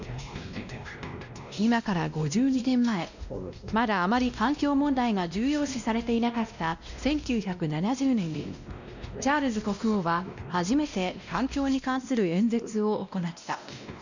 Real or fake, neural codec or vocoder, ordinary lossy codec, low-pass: fake; codec, 16 kHz, 1 kbps, X-Codec, WavLM features, trained on Multilingual LibriSpeech; none; 7.2 kHz